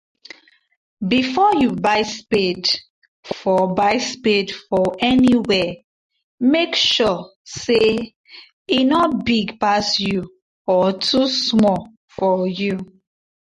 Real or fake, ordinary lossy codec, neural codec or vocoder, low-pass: real; MP3, 48 kbps; none; 14.4 kHz